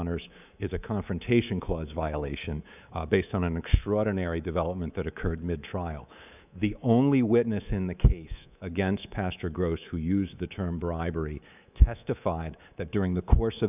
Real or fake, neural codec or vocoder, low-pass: fake; codec, 24 kHz, 3.1 kbps, DualCodec; 3.6 kHz